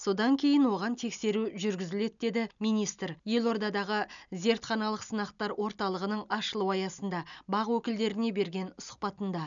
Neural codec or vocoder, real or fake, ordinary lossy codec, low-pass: none; real; none; 7.2 kHz